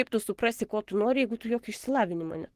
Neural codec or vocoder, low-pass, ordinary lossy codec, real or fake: codec, 44.1 kHz, 7.8 kbps, Pupu-Codec; 14.4 kHz; Opus, 16 kbps; fake